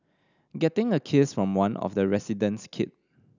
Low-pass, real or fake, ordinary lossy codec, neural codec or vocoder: 7.2 kHz; real; none; none